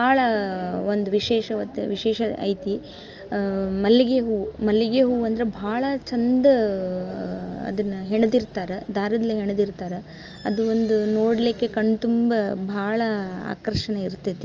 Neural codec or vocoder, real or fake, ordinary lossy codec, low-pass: none; real; Opus, 24 kbps; 7.2 kHz